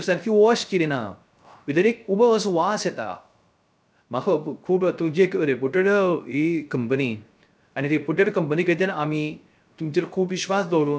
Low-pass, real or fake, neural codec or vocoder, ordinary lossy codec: none; fake; codec, 16 kHz, 0.3 kbps, FocalCodec; none